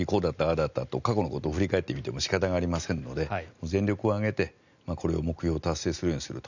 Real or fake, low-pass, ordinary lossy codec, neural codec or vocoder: real; 7.2 kHz; none; none